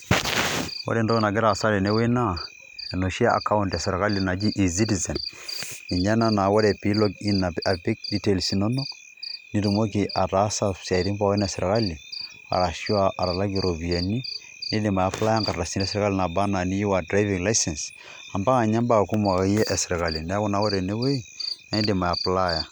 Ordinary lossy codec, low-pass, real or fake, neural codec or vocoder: none; none; real; none